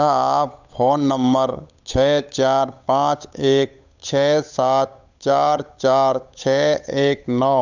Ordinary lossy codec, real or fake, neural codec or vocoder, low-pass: none; fake; codec, 16 kHz, 8 kbps, FunCodec, trained on Chinese and English, 25 frames a second; 7.2 kHz